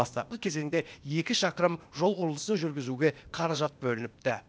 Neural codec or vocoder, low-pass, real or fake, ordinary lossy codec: codec, 16 kHz, 0.8 kbps, ZipCodec; none; fake; none